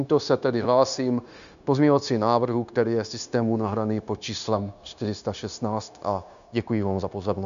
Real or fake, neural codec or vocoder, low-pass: fake; codec, 16 kHz, 0.9 kbps, LongCat-Audio-Codec; 7.2 kHz